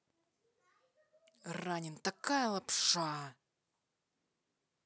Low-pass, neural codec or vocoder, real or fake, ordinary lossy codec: none; none; real; none